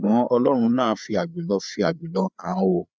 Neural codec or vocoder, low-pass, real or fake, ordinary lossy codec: codec, 16 kHz, 4 kbps, FreqCodec, larger model; none; fake; none